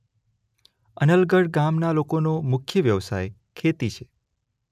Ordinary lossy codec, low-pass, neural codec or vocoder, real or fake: none; 14.4 kHz; none; real